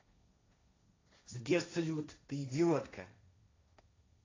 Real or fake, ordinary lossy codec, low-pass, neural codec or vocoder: fake; AAC, 32 kbps; 7.2 kHz; codec, 16 kHz, 1.1 kbps, Voila-Tokenizer